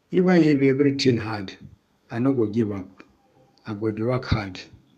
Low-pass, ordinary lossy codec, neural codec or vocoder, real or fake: 14.4 kHz; none; codec, 32 kHz, 1.9 kbps, SNAC; fake